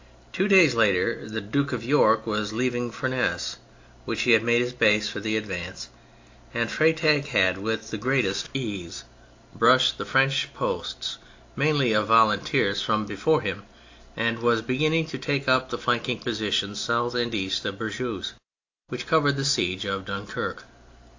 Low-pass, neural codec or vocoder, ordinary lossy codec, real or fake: 7.2 kHz; none; AAC, 48 kbps; real